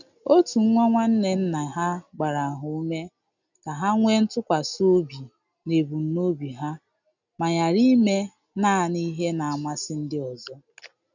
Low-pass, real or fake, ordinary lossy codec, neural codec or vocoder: 7.2 kHz; real; none; none